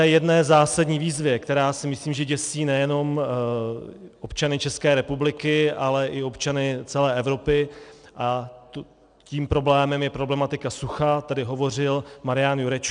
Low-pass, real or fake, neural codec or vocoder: 9.9 kHz; real; none